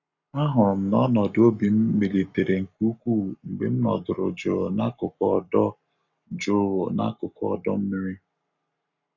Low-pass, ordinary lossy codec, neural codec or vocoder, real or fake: 7.2 kHz; none; codec, 44.1 kHz, 7.8 kbps, Pupu-Codec; fake